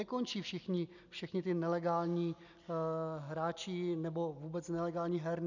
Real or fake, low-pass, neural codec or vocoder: real; 7.2 kHz; none